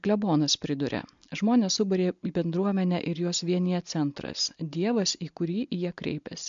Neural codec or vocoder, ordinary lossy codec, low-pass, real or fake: none; MP3, 64 kbps; 7.2 kHz; real